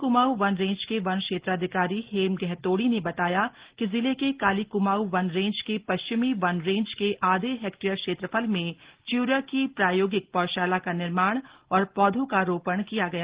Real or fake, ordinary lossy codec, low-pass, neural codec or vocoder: real; Opus, 16 kbps; 3.6 kHz; none